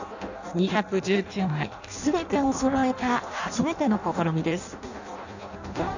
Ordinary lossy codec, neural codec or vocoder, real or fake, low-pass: none; codec, 16 kHz in and 24 kHz out, 0.6 kbps, FireRedTTS-2 codec; fake; 7.2 kHz